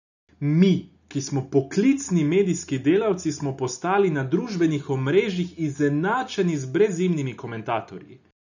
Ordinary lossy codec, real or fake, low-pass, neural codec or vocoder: none; real; 7.2 kHz; none